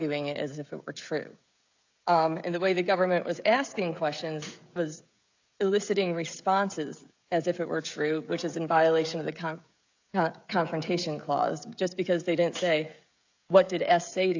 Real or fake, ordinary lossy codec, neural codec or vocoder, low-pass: fake; AAC, 48 kbps; codec, 16 kHz, 16 kbps, FreqCodec, smaller model; 7.2 kHz